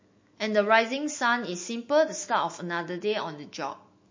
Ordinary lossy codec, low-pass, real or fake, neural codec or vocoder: MP3, 32 kbps; 7.2 kHz; fake; autoencoder, 48 kHz, 128 numbers a frame, DAC-VAE, trained on Japanese speech